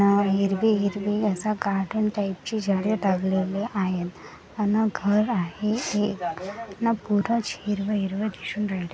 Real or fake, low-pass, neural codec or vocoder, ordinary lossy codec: real; none; none; none